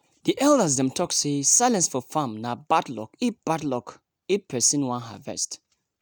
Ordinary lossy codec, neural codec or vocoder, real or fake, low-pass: none; none; real; none